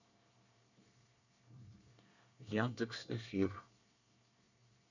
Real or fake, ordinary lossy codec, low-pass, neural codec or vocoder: fake; none; 7.2 kHz; codec, 24 kHz, 1 kbps, SNAC